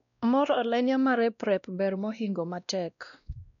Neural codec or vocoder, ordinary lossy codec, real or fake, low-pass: codec, 16 kHz, 1 kbps, X-Codec, WavLM features, trained on Multilingual LibriSpeech; none; fake; 7.2 kHz